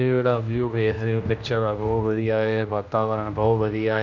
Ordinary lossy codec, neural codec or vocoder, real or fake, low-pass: none; codec, 16 kHz, 1 kbps, X-Codec, HuBERT features, trained on general audio; fake; 7.2 kHz